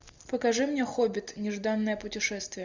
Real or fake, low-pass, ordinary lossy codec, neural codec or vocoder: real; 7.2 kHz; Opus, 64 kbps; none